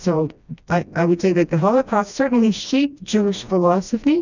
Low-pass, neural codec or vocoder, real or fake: 7.2 kHz; codec, 16 kHz, 1 kbps, FreqCodec, smaller model; fake